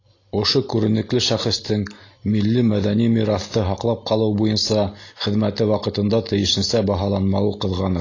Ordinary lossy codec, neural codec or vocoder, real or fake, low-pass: AAC, 32 kbps; none; real; 7.2 kHz